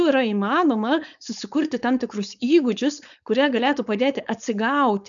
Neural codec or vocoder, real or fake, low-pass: codec, 16 kHz, 4.8 kbps, FACodec; fake; 7.2 kHz